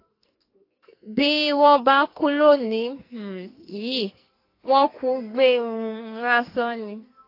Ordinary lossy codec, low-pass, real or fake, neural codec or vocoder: AAC, 32 kbps; 5.4 kHz; fake; codec, 44.1 kHz, 2.6 kbps, SNAC